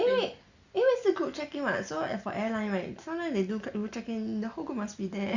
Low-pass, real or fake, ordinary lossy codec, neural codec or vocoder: 7.2 kHz; real; Opus, 64 kbps; none